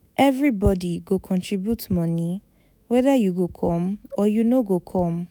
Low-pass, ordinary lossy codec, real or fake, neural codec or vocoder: none; none; fake; autoencoder, 48 kHz, 128 numbers a frame, DAC-VAE, trained on Japanese speech